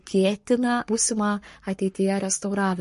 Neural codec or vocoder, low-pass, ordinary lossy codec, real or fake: codec, 44.1 kHz, 7.8 kbps, Pupu-Codec; 14.4 kHz; MP3, 48 kbps; fake